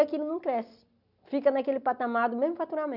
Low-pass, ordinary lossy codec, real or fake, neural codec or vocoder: 5.4 kHz; none; real; none